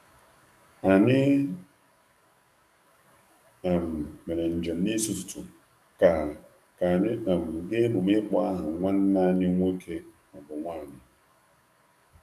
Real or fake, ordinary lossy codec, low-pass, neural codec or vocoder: fake; none; 14.4 kHz; codec, 44.1 kHz, 7.8 kbps, DAC